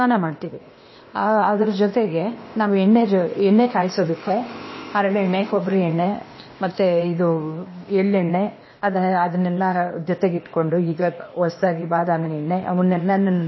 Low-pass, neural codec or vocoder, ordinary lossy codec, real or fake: 7.2 kHz; codec, 16 kHz, 0.8 kbps, ZipCodec; MP3, 24 kbps; fake